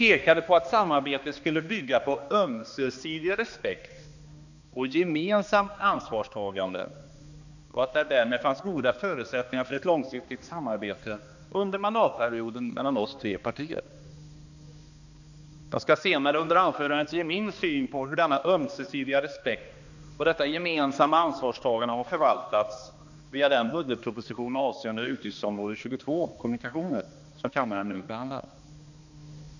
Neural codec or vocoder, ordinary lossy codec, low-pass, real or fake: codec, 16 kHz, 2 kbps, X-Codec, HuBERT features, trained on balanced general audio; none; 7.2 kHz; fake